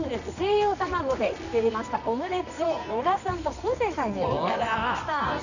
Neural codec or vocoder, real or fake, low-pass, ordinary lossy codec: codec, 24 kHz, 0.9 kbps, WavTokenizer, medium music audio release; fake; 7.2 kHz; none